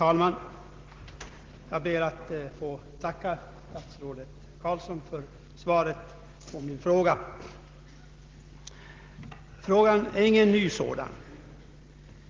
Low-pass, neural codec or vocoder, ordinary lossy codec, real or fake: 7.2 kHz; none; Opus, 16 kbps; real